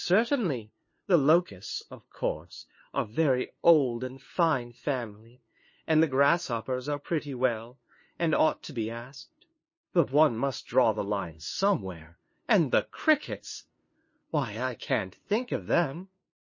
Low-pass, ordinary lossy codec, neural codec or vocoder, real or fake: 7.2 kHz; MP3, 32 kbps; codec, 16 kHz, 2 kbps, FunCodec, trained on LibriTTS, 25 frames a second; fake